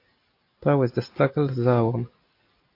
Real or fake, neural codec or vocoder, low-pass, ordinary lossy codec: real; none; 5.4 kHz; AAC, 32 kbps